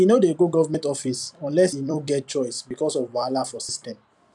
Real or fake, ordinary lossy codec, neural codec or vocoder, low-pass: fake; none; vocoder, 44.1 kHz, 128 mel bands every 256 samples, BigVGAN v2; 10.8 kHz